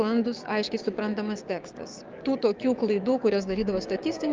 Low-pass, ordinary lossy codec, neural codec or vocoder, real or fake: 7.2 kHz; Opus, 24 kbps; codec, 16 kHz, 6 kbps, DAC; fake